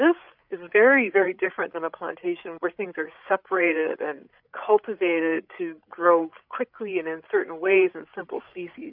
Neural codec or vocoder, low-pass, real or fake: codec, 16 kHz, 8 kbps, FreqCodec, larger model; 5.4 kHz; fake